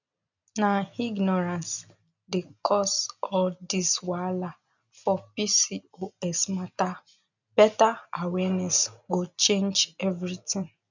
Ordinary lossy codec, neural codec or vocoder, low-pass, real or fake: none; none; 7.2 kHz; real